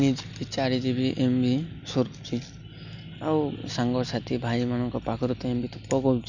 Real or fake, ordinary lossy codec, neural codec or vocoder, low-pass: real; none; none; 7.2 kHz